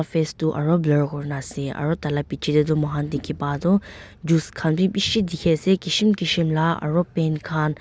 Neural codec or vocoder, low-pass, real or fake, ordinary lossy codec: none; none; real; none